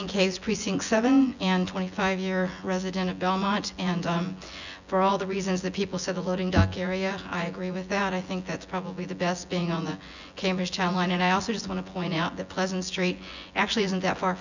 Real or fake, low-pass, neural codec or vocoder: fake; 7.2 kHz; vocoder, 24 kHz, 100 mel bands, Vocos